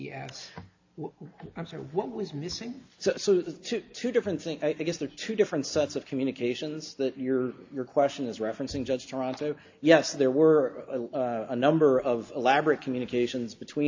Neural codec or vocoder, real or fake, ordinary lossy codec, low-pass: none; real; AAC, 48 kbps; 7.2 kHz